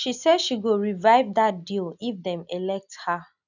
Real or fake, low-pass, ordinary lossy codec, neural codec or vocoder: real; 7.2 kHz; none; none